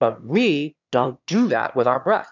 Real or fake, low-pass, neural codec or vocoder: fake; 7.2 kHz; autoencoder, 22.05 kHz, a latent of 192 numbers a frame, VITS, trained on one speaker